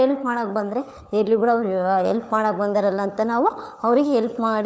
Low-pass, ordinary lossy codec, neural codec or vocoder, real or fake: none; none; codec, 16 kHz, 4 kbps, FunCodec, trained on LibriTTS, 50 frames a second; fake